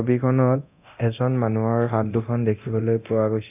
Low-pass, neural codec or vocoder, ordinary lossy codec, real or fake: 3.6 kHz; codec, 24 kHz, 0.9 kbps, DualCodec; none; fake